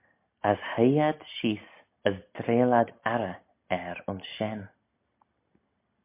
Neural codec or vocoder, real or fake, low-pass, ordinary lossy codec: none; real; 3.6 kHz; MP3, 24 kbps